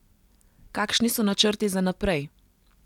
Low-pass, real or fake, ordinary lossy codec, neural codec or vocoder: 19.8 kHz; real; none; none